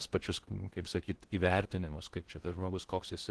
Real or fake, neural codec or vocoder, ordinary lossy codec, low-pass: fake; codec, 16 kHz in and 24 kHz out, 0.6 kbps, FocalCodec, streaming, 2048 codes; Opus, 16 kbps; 10.8 kHz